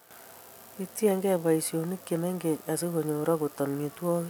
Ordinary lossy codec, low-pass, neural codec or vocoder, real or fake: none; none; none; real